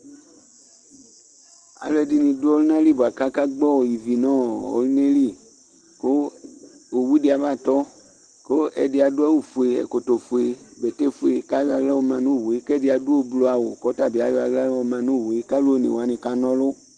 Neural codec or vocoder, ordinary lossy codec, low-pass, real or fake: none; Opus, 16 kbps; 9.9 kHz; real